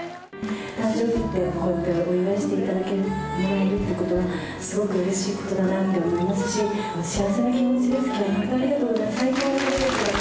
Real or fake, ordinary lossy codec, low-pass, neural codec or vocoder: real; none; none; none